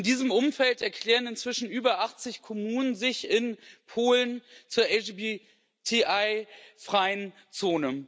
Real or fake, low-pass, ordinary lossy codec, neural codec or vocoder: real; none; none; none